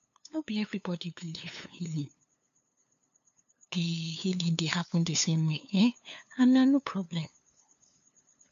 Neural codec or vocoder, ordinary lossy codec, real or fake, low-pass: codec, 16 kHz, 2 kbps, FunCodec, trained on LibriTTS, 25 frames a second; none; fake; 7.2 kHz